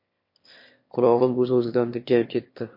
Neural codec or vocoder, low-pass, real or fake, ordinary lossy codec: autoencoder, 22.05 kHz, a latent of 192 numbers a frame, VITS, trained on one speaker; 5.4 kHz; fake; MP3, 32 kbps